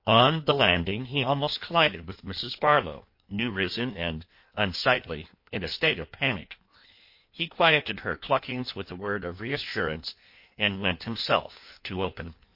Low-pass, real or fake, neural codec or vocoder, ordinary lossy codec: 5.4 kHz; fake; codec, 16 kHz in and 24 kHz out, 1.1 kbps, FireRedTTS-2 codec; MP3, 32 kbps